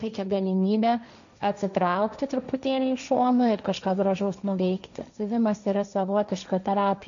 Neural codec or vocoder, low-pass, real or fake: codec, 16 kHz, 1.1 kbps, Voila-Tokenizer; 7.2 kHz; fake